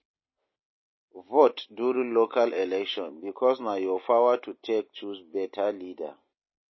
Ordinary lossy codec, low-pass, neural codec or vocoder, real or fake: MP3, 24 kbps; 7.2 kHz; none; real